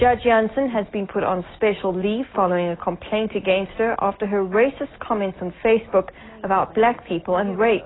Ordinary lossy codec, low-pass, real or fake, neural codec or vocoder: AAC, 16 kbps; 7.2 kHz; real; none